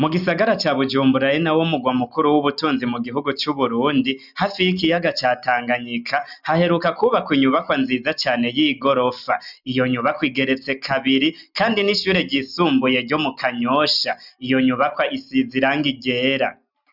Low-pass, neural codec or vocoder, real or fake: 5.4 kHz; none; real